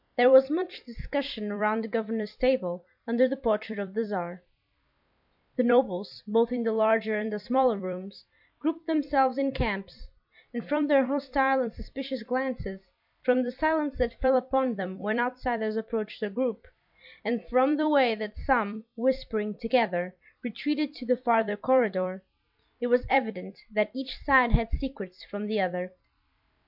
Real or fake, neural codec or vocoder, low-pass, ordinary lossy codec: fake; vocoder, 44.1 kHz, 128 mel bands every 256 samples, BigVGAN v2; 5.4 kHz; MP3, 48 kbps